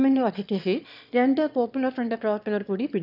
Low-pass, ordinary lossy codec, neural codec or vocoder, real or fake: 5.4 kHz; none; autoencoder, 22.05 kHz, a latent of 192 numbers a frame, VITS, trained on one speaker; fake